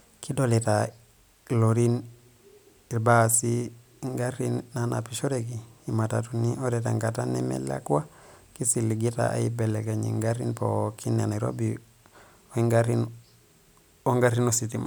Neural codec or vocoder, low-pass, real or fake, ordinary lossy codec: none; none; real; none